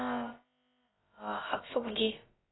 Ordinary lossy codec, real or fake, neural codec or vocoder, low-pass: AAC, 16 kbps; fake; codec, 16 kHz, about 1 kbps, DyCAST, with the encoder's durations; 7.2 kHz